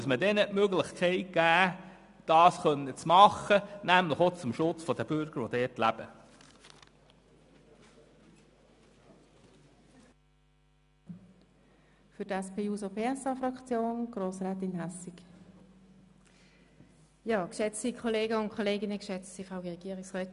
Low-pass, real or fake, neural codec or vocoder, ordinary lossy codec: 10.8 kHz; real; none; MP3, 96 kbps